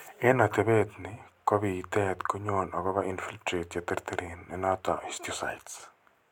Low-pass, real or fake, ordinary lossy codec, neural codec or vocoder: 19.8 kHz; real; none; none